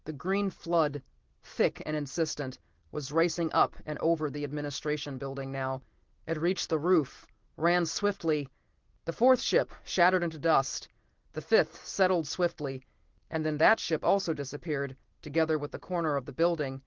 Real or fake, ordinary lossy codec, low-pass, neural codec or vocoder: real; Opus, 16 kbps; 7.2 kHz; none